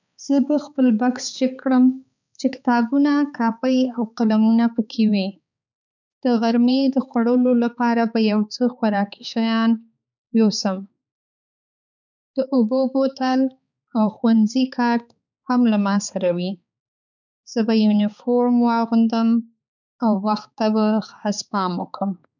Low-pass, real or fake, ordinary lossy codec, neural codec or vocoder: 7.2 kHz; fake; none; codec, 16 kHz, 4 kbps, X-Codec, HuBERT features, trained on balanced general audio